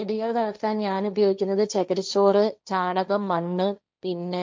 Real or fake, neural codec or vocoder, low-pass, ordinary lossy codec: fake; codec, 16 kHz, 1.1 kbps, Voila-Tokenizer; none; none